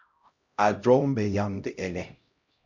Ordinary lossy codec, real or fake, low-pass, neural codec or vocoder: Opus, 64 kbps; fake; 7.2 kHz; codec, 16 kHz, 0.5 kbps, X-Codec, HuBERT features, trained on LibriSpeech